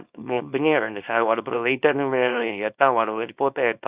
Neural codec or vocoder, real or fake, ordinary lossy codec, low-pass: codec, 24 kHz, 0.9 kbps, WavTokenizer, small release; fake; Opus, 64 kbps; 3.6 kHz